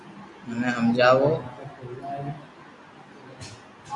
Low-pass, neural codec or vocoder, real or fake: 10.8 kHz; none; real